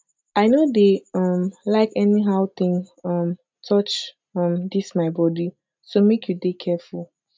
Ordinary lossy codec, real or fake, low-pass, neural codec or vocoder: none; real; none; none